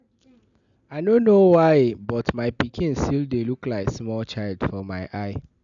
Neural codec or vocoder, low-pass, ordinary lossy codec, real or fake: none; 7.2 kHz; none; real